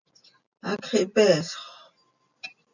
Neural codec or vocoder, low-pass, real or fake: none; 7.2 kHz; real